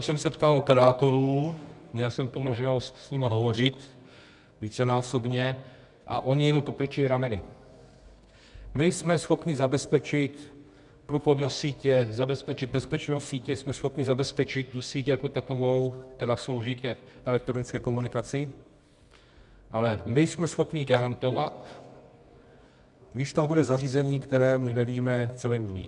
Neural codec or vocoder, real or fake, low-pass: codec, 24 kHz, 0.9 kbps, WavTokenizer, medium music audio release; fake; 10.8 kHz